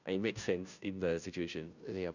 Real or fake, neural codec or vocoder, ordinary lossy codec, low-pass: fake; codec, 16 kHz, 0.5 kbps, FunCodec, trained on Chinese and English, 25 frames a second; none; 7.2 kHz